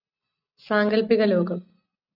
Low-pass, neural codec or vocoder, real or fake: 5.4 kHz; none; real